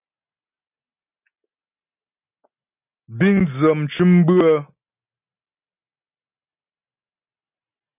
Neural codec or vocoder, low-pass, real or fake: none; 3.6 kHz; real